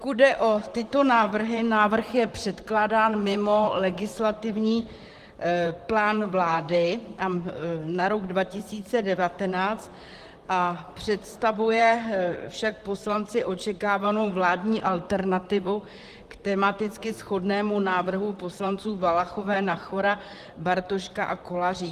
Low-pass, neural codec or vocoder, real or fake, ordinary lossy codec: 14.4 kHz; vocoder, 44.1 kHz, 128 mel bands, Pupu-Vocoder; fake; Opus, 24 kbps